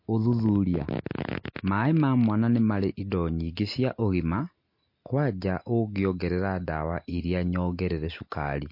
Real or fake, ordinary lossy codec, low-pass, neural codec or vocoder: real; MP3, 32 kbps; 5.4 kHz; none